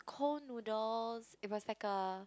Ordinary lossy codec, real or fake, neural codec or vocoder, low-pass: none; real; none; none